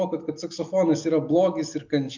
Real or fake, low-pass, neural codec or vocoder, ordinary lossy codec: real; 7.2 kHz; none; MP3, 64 kbps